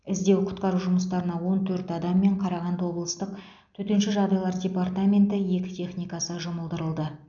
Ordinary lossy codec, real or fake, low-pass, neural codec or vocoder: none; real; 7.2 kHz; none